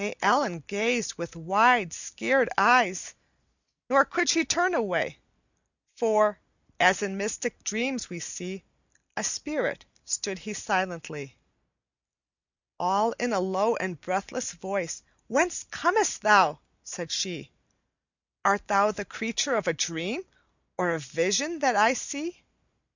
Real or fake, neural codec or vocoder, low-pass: real; none; 7.2 kHz